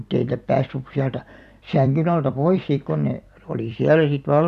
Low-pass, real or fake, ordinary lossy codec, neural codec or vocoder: 14.4 kHz; fake; none; vocoder, 44.1 kHz, 128 mel bands every 256 samples, BigVGAN v2